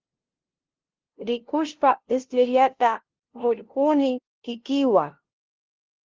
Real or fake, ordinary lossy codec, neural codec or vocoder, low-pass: fake; Opus, 16 kbps; codec, 16 kHz, 0.5 kbps, FunCodec, trained on LibriTTS, 25 frames a second; 7.2 kHz